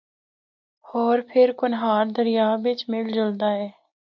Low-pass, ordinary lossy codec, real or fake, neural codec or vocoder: 7.2 kHz; MP3, 48 kbps; real; none